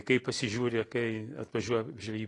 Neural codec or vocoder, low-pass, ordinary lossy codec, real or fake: none; 10.8 kHz; AAC, 32 kbps; real